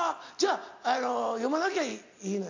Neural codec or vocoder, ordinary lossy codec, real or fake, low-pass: none; AAC, 48 kbps; real; 7.2 kHz